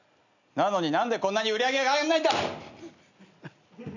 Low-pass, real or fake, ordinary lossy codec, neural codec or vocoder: 7.2 kHz; real; none; none